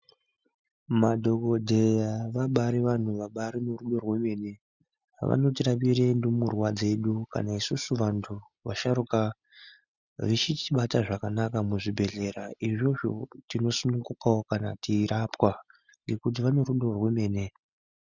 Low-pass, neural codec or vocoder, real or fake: 7.2 kHz; none; real